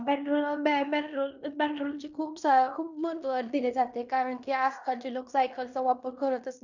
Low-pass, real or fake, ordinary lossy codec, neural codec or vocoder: 7.2 kHz; fake; none; codec, 16 kHz in and 24 kHz out, 0.9 kbps, LongCat-Audio-Codec, fine tuned four codebook decoder